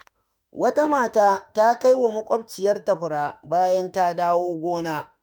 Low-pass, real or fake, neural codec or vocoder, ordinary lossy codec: none; fake; autoencoder, 48 kHz, 32 numbers a frame, DAC-VAE, trained on Japanese speech; none